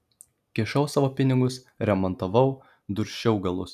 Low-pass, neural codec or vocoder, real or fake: 14.4 kHz; none; real